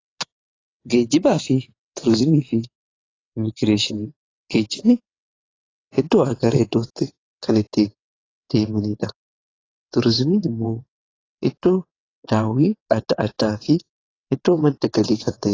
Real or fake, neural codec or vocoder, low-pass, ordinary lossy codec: fake; vocoder, 22.05 kHz, 80 mel bands, WaveNeXt; 7.2 kHz; AAC, 32 kbps